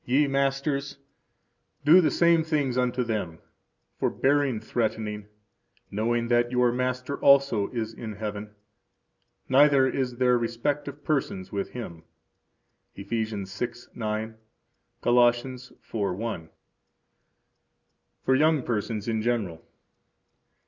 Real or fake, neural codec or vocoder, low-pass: real; none; 7.2 kHz